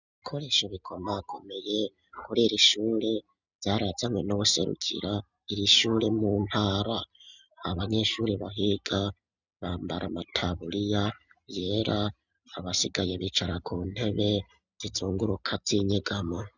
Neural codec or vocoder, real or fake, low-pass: none; real; 7.2 kHz